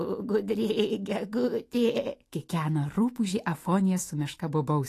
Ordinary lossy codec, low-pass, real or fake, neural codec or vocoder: AAC, 48 kbps; 14.4 kHz; fake; autoencoder, 48 kHz, 128 numbers a frame, DAC-VAE, trained on Japanese speech